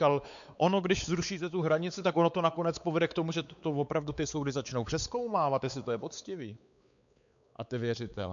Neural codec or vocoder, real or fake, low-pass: codec, 16 kHz, 4 kbps, X-Codec, WavLM features, trained on Multilingual LibriSpeech; fake; 7.2 kHz